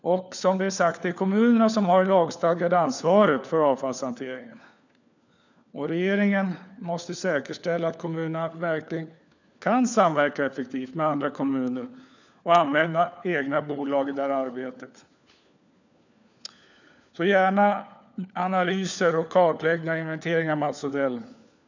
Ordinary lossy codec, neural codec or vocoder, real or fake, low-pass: none; codec, 16 kHz, 4 kbps, FunCodec, trained on LibriTTS, 50 frames a second; fake; 7.2 kHz